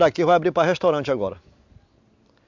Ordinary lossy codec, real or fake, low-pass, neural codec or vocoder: MP3, 64 kbps; real; 7.2 kHz; none